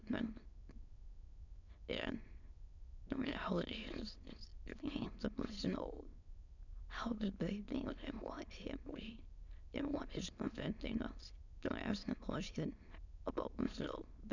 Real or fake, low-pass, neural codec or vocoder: fake; 7.2 kHz; autoencoder, 22.05 kHz, a latent of 192 numbers a frame, VITS, trained on many speakers